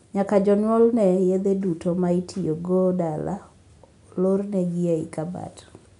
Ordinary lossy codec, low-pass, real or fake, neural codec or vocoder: none; 10.8 kHz; real; none